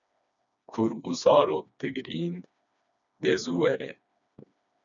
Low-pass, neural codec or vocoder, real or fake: 7.2 kHz; codec, 16 kHz, 2 kbps, FreqCodec, smaller model; fake